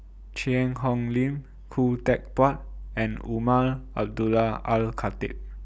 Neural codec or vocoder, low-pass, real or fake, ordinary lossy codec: none; none; real; none